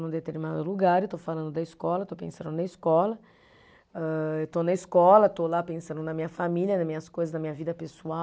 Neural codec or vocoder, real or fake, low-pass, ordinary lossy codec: none; real; none; none